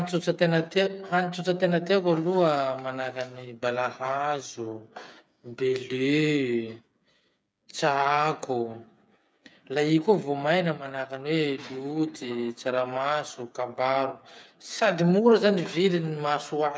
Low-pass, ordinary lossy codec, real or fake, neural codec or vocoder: none; none; fake; codec, 16 kHz, 8 kbps, FreqCodec, smaller model